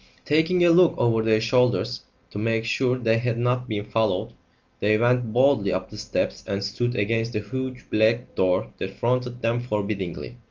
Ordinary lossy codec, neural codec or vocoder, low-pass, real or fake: Opus, 32 kbps; none; 7.2 kHz; real